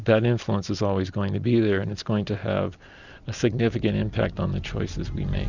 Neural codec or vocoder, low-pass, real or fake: none; 7.2 kHz; real